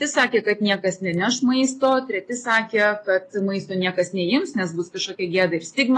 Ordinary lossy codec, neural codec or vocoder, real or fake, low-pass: AAC, 32 kbps; none; real; 10.8 kHz